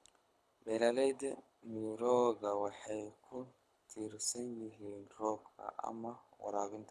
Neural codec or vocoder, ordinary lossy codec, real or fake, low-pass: codec, 24 kHz, 6 kbps, HILCodec; none; fake; none